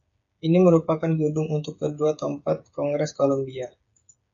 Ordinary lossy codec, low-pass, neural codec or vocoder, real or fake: MP3, 96 kbps; 7.2 kHz; codec, 16 kHz, 8 kbps, FreqCodec, smaller model; fake